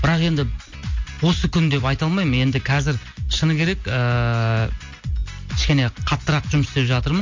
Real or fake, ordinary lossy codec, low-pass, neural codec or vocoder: real; MP3, 48 kbps; 7.2 kHz; none